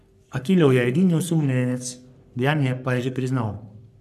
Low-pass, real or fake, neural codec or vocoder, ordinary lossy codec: 14.4 kHz; fake; codec, 44.1 kHz, 3.4 kbps, Pupu-Codec; none